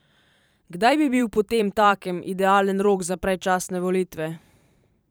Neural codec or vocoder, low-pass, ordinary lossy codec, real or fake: vocoder, 44.1 kHz, 128 mel bands every 512 samples, BigVGAN v2; none; none; fake